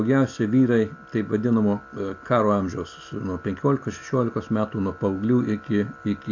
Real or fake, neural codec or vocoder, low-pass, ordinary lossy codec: real; none; 7.2 kHz; AAC, 48 kbps